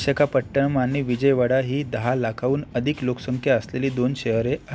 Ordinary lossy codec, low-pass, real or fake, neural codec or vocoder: none; none; real; none